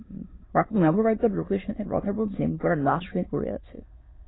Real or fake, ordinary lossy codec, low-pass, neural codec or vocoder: fake; AAC, 16 kbps; 7.2 kHz; autoencoder, 22.05 kHz, a latent of 192 numbers a frame, VITS, trained on many speakers